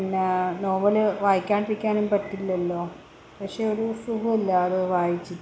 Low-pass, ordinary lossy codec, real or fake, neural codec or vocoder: none; none; real; none